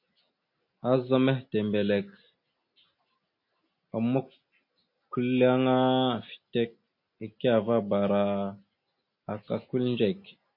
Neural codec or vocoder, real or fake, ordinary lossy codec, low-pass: none; real; MP3, 32 kbps; 5.4 kHz